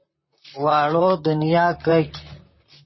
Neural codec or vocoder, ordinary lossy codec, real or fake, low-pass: vocoder, 44.1 kHz, 128 mel bands, Pupu-Vocoder; MP3, 24 kbps; fake; 7.2 kHz